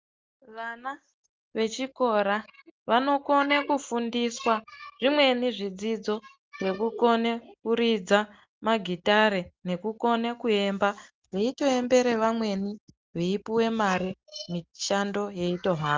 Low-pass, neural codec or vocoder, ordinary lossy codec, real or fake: 7.2 kHz; none; Opus, 24 kbps; real